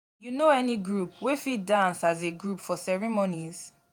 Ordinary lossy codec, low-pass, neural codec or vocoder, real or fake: none; none; vocoder, 48 kHz, 128 mel bands, Vocos; fake